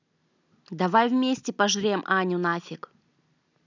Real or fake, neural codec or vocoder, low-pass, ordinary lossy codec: real; none; 7.2 kHz; none